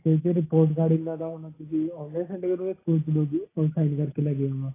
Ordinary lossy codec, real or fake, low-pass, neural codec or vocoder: AAC, 16 kbps; real; 3.6 kHz; none